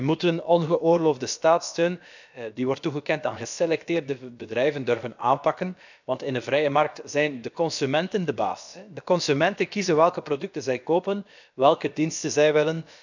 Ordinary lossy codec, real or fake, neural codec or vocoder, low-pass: none; fake; codec, 16 kHz, about 1 kbps, DyCAST, with the encoder's durations; 7.2 kHz